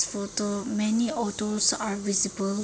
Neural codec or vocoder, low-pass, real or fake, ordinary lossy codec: none; none; real; none